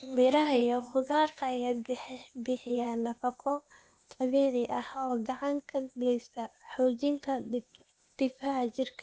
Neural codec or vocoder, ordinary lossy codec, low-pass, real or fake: codec, 16 kHz, 0.8 kbps, ZipCodec; none; none; fake